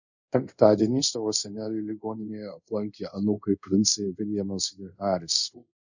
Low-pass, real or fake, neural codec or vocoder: 7.2 kHz; fake; codec, 24 kHz, 0.5 kbps, DualCodec